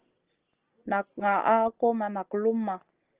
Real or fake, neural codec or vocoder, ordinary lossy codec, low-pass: real; none; Opus, 16 kbps; 3.6 kHz